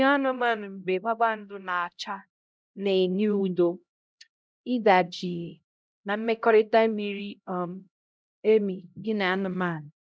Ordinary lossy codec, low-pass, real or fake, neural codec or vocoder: none; none; fake; codec, 16 kHz, 0.5 kbps, X-Codec, HuBERT features, trained on LibriSpeech